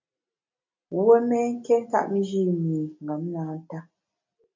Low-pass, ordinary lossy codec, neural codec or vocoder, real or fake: 7.2 kHz; MP3, 64 kbps; none; real